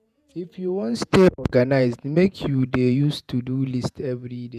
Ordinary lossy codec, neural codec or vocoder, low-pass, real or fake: none; vocoder, 48 kHz, 128 mel bands, Vocos; 14.4 kHz; fake